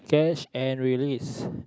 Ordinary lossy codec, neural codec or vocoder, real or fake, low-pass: none; none; real; none